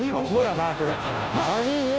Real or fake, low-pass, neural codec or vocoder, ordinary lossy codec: fake; none; codec, 16 kHz, 0.5 kbps, FunCodec, trained on Chinese and English, 25 frames a second; none